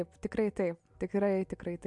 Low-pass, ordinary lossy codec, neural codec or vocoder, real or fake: 10.8 kHz; MP3, 64 kbps; none; real